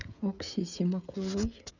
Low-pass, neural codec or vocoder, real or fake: 7.2 kHz; codec, 16 kHz, 16 kbps, FreqCodec, smaller model; fake